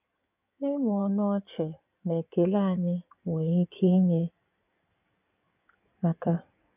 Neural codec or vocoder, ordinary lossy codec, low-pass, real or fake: codec, 16 kHz in and 24 kHz out, 2.2 kbps, FireRedTTS-2 codec; none; 3.6 kHz; fake